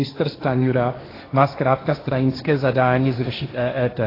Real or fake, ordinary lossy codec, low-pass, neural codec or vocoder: fake; AAC, 24 kbps; 5.4 kHz; codec, 16 kHz, 1.1 kbps, Voila-Tokenizer